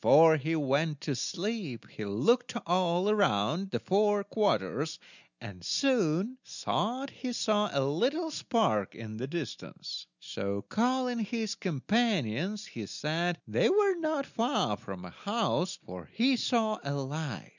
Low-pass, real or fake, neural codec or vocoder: 7.2 kHz; real; none